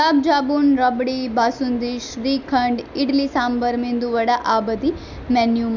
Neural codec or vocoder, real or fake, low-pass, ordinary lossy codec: none; real; 7.2 kHz; none